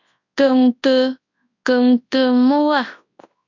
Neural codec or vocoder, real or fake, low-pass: codec, 24 kHz, 0.9 kbps, WavTokenizer, large speech release; fake; 7.2 kHz